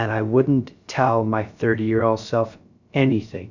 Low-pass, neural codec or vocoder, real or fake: 7.2 kHz; codec, 16 kHz, 0.3 kbps, FocalCodec; fake